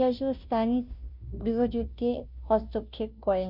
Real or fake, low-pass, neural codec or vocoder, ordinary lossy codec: fake; 5.4 kHz; codec, 16 kHz, 0.5 kbps, FunCodec, trained on Chinese and English, 25 frames a second; none